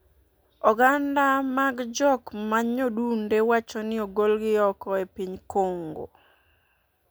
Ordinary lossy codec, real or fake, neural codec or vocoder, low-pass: none; real; none; none